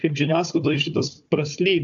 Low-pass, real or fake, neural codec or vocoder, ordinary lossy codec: 7.2 kHz; fake; codec, 16 kHz, 4 kbps, FunCodec, trained on Chinese and English, 50 frames a second; AAC, 64 kbps